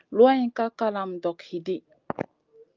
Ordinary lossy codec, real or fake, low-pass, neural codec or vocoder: Opus, 24 kbps; real; 7.2 kHz; none